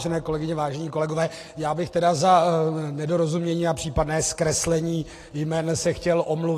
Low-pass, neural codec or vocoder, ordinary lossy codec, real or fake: 14.4 kHz; none; AAC, 48 kbps; real